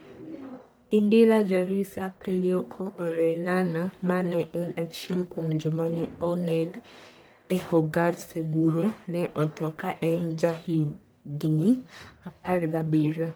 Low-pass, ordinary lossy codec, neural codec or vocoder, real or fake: none; none; codec, 44.1 kHz, 1.7 kbps, Pupu-Codec; fake